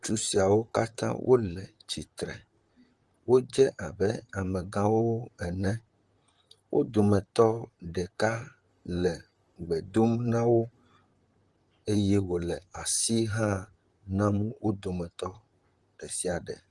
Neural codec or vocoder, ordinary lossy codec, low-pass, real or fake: vocoder, 44.1 kHz, 128 mel bands, Pupu-Vocoder; Opus, 24 kbps; 10.8 kHz; fake